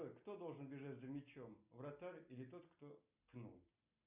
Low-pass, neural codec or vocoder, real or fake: 3.6 kHz; none; real